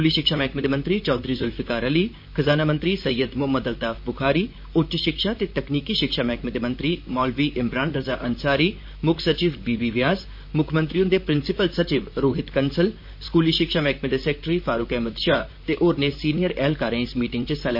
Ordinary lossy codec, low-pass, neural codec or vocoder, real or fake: MP3, 32 kbps; 5.4 kHz; vocoder, 44.1 kHz, 128 mel bands, Pupu-Vocoder; fake